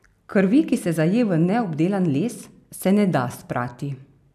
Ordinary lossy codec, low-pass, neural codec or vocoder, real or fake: none; 14.4 kHz; none; real